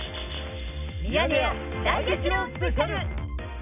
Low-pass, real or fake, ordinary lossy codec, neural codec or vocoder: 3.6 kHz; real; none; none